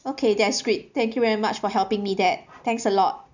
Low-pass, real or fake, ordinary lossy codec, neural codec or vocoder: 7.2 kHz; real; none; none